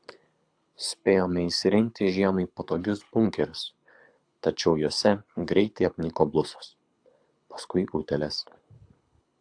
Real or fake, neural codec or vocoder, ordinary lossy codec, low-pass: fake; codec, 24 kHz, 6 kbps, HILCodec; AAC, 64 kbps; 9.9 kHz